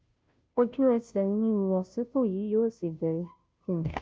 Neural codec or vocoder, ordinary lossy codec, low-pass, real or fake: codec, 16 kHz, 0.5 kbps, FunCodec, trained on Chinese and English, 25 frames a second; none; none; fake